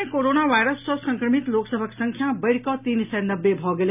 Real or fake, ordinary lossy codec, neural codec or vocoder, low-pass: real; none; none; 3.6 kHz